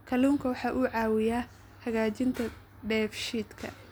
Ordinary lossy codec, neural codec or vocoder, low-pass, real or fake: none; none; none; real